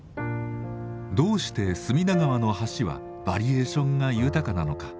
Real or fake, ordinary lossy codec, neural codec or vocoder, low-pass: real; none; none; none